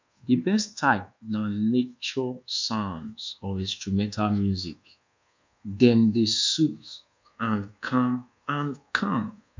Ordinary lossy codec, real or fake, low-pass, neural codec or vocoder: MP3, 64 kbps; fake; 7.2 kHz; codec, 24 kHz, 1.2 kbps, DualCodec